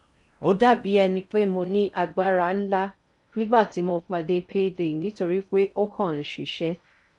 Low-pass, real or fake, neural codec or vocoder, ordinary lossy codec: 10.8 kHz; fake; codec, 16 kHz in and 24 kHz out, 0.6 kbps, FocalCodec, streaming, 4096 codes; none